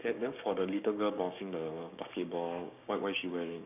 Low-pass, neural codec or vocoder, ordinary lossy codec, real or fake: 3.6 kHz; codec, 16 kHz, 6 kbps, DAC; none; fake